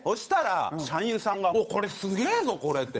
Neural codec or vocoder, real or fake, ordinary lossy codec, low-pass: codec, 16 kHz, 8 kbps, FunCodec, trained on Chinese and English, 25 frames a second; fake; none; none